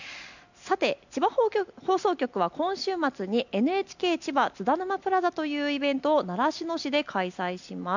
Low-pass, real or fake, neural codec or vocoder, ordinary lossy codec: 7.2 kHz; real; none; none